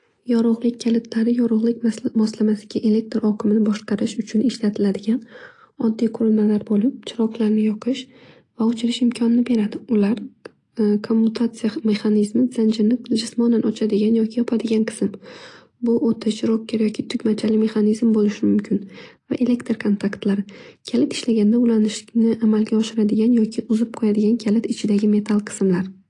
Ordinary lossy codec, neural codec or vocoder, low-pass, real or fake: AAC, 48 kbps; none; 10.8 kHz; real